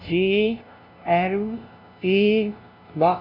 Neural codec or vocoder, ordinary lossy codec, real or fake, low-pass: codec, 16 kHz, 0.5 kbps, FunCodec, trained on LibriTTS, 25 frames a second; none; fake; 5.4 kHz